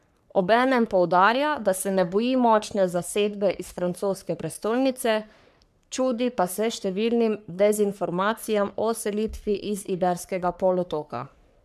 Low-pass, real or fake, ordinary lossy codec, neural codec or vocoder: 14.4 kHz; fake; none; codec, 44.1 kHz, 3.4 kbps, Pupu-Codec